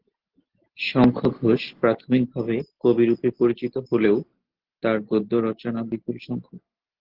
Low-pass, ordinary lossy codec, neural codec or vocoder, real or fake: 5.4 kHz; Opus, 16 kbps; none; real